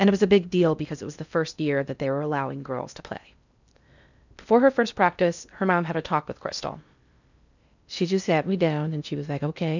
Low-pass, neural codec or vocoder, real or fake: 7.2 kHz; codec, 16 kHz in and 24 kHz out, 0.6 kbps, FocalCodec, streaming, 4096 codes; fake